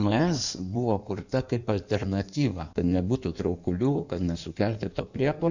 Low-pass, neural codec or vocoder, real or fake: 7.2 kHz; codec, 16 kHz in and 24 kHz out, 1.1 kbps, FireRedTTS-2 codec; fake